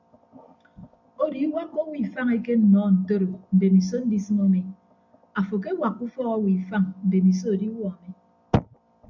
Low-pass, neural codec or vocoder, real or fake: 7.2 kHz; none; real